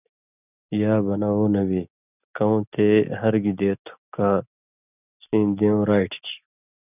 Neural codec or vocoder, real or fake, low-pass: none; real; 3.6 kHz